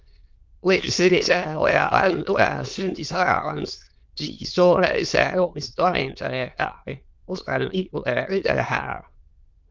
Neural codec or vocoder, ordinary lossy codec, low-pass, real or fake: autoencoder, 22.05 kHz, a latent of 192 numbers a frame, VITS, trained on many speakers; Opus, 24 kbps; 7.2 kHz; fake